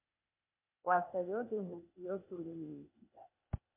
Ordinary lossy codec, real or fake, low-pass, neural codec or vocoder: MP3, 24 kbps; fake; 3.6 kHz; codec, 16 kHz, 0.8 kbps, ZipCodec